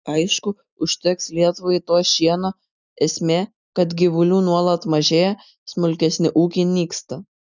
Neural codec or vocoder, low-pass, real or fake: none; 7.2 kHz; real